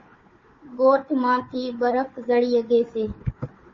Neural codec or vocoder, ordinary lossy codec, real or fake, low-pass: codec, 16 kHz, 4 kbps, FunCodec, trained on Chinese and English, 50 frames a second; MP3, 32 kbps; fake; 7.2 kHz